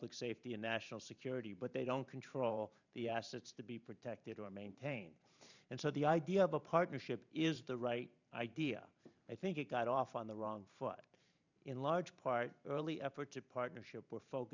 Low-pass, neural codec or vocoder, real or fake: 7.2 kHz; vocoder, 44.1 kHz, 128 mel bands every 512 samples, BigVGAN v2; fake